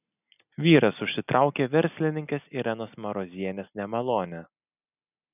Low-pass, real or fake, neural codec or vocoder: 3.6 kHz; real; none